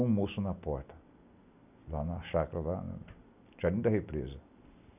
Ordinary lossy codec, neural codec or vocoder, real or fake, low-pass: none; none; real; 3.6 kHz